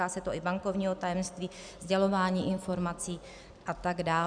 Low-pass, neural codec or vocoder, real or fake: 9.9 kHz; none; real